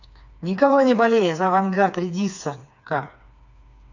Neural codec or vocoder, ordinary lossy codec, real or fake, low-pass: codec, 16 kHz, 4 kbps, FreqCodec, smaller model; none; fake; 7.2 kHz